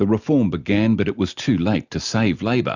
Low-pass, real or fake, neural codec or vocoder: 7.2 kHz; real; none